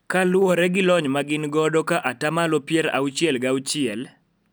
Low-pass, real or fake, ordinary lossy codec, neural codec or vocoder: none; real; none; none